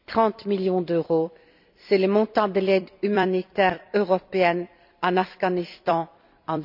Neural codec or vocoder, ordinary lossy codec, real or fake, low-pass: vocoder, 44.1 kHz, 128 mel bands every 256 samples, BigVGAN v2; none; fake; 5.4 kHz